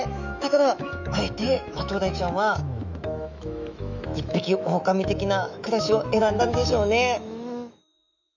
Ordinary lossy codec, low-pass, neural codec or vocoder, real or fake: none; 7.2 kHz; autoencoder, 48 kHz, 128 numbers a frame, DAC-VAE, trained on Japanese speech; fake